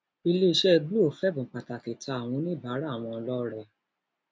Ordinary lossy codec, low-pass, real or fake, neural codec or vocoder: none; none; real; none